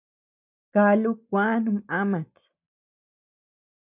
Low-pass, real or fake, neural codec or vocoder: 3.6 kHz; real; none